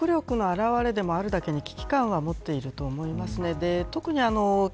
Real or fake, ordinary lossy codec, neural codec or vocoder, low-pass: real; none; none; none